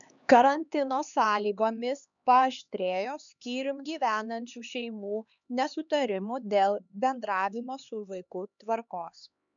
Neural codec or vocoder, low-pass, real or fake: codec, 16 kHz, 2 kbps, X-Codec, HuBERT features, trained on LibriSpeech; 7.2 kHz; fake